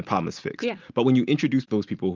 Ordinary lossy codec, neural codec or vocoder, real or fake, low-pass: Opus, 24 kbps; none; real; 7.2 kHz